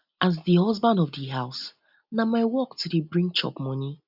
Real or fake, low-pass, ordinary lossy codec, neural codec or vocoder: real; 5.4 kHz; none; none